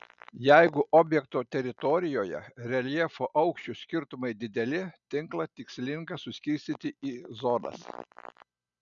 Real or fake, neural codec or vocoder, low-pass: real; none; 7.2 kHz